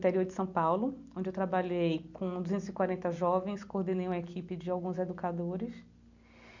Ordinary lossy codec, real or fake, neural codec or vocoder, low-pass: none; real; none; 7.2 kHz